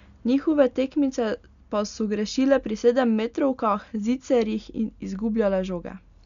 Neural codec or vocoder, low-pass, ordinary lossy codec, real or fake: none; 7.2 kHz; none; real